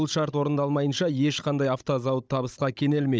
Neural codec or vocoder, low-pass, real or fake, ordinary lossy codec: codec, 16 kHz, 16 kbps, FunCodec, trained on Chinese and English, 50 frames a second; none; fake; none